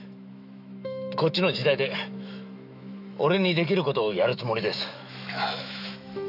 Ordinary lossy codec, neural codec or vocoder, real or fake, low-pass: none; autoencoder, 48 kHz, 128 numbers a frame, DAC-VAE, trained on Japanese speech; fake; 5.4 kHz